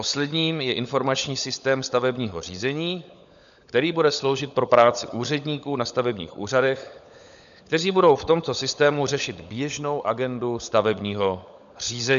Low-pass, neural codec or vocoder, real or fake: 7.2 kHz; codec, 16 kHz, 16 kbps, FunCodec, trained on LibriTTS, 50 frames a second; fake